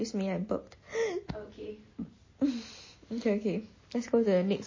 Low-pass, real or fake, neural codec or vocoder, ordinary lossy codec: 7.2 kHz; real; none; MP3, 32 kbps